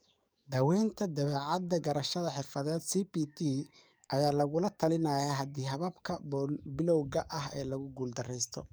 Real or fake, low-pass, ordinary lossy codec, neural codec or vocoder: fake; none; none; codec, 44.1 kHz, 7.8 kbps, DAC